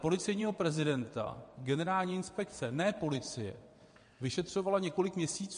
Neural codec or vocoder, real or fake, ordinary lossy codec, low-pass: vocoder, 22.05 kHz, 80 mel bands, WaveNeXt; fake; MP3, 48 kbps; 9.9 kHz